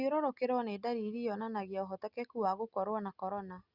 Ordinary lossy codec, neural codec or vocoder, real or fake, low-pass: Opus, 64 kbps; none; real; 5.4 kHz